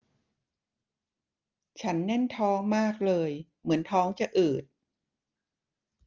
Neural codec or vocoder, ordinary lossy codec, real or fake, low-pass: none; Opus, 24 kbps; real; 7.2 kHz